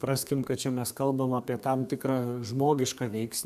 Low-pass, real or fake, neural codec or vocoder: 14.4 kHz; fake; codec, 32 kHz, 1.9 kbps, SNAC